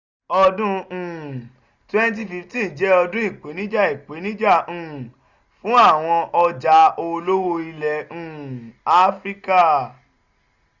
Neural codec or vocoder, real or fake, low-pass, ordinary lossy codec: none; real; 7.2 kHz; none